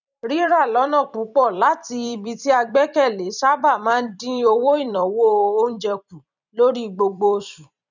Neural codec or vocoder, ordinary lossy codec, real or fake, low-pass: vocoder, 44.1 kHz, 128 mel bands every 256 samples, BigVGAN v2; none; fake; 7.2 kHz